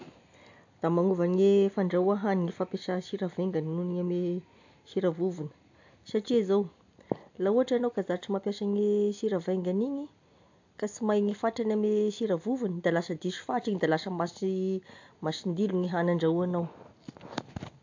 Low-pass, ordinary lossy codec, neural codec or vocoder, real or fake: 7.2 kHz; AAC, 48 kbps; none; real